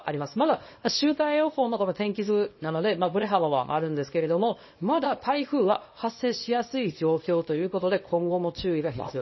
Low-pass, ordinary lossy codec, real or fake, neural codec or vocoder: 7.2 kHz; MP3, 24 kbps; fake; codec, 24 kHz, 0.9 kbps, WavTokenizer, small release